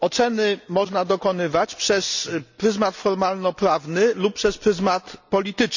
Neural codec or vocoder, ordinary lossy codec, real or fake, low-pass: none; none; real; 7.2 kHz